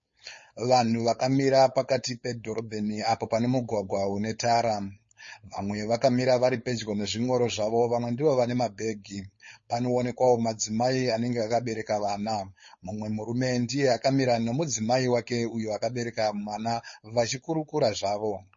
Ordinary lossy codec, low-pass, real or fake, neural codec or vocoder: MP3, 32 kbps; 7.2 kHz; fake; codec, 16 kHz, 4.8 kbps, FACodec